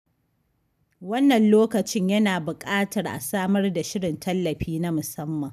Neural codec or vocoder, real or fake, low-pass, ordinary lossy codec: none; real; 14.4 kHz; none